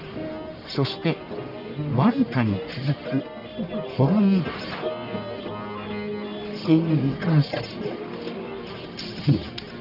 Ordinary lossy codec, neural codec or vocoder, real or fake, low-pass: none; codec, 44.1 kHz, 1.7 kbps, Pupu-Codec; fake; 5.4 kHz